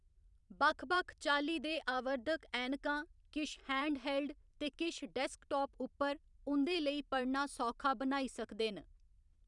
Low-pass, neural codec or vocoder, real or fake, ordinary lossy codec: 10.8 kHz; vocoder, 44.1 kHz, 128 mel bands every 512 samples, BigVGAN v2; fake; none